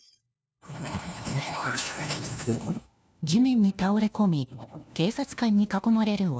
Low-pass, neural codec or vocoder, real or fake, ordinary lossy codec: none; codec, 16 kHz, 1 kbps, FunCodec, trained on LibriTTS, 50 frames a second; fake; none